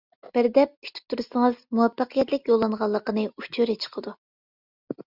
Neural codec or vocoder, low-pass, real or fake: none; 5.4 kHz; real